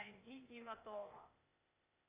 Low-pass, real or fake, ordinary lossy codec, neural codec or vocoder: 3.6 kHz; fake; Opus, 64 kbps; codec, 16 kHz, 0.8 kbps, ZipCodec